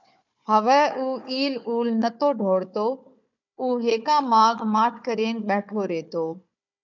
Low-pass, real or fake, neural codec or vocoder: 7.2 kHz; fake; codec, 16 kHz, 4 kbps, FunCodec, trained on Chinese and English, 50 frames a second